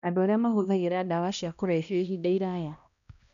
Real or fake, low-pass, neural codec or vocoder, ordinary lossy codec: fake; 7.2 kHz; codec, 16 kHz, 1 kbps, X-Codec, HuBERT features, trained on balanced general audio; none